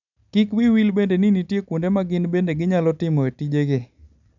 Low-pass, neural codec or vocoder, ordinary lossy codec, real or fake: 7.2 kHz; none; none; real